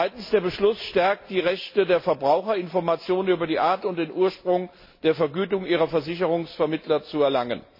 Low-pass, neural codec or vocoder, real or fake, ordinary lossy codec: 5.4 kHz; none; real; MP3, 24 kbps